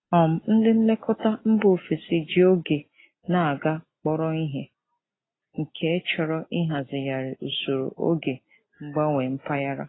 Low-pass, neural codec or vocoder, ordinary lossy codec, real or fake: 7.2 kHz; none; AAC, 16 kbps; real